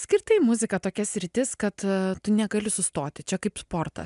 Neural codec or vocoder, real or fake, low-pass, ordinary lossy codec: none; real; 10.8 kHz; MP3, 96 kbps